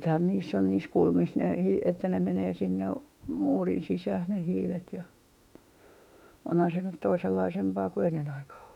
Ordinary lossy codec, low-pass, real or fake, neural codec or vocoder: none; 19.8 kHz; fake; autoencoder, 48 kHz, 32 numbers a frame, DAC-VAE, trained on Japanese speech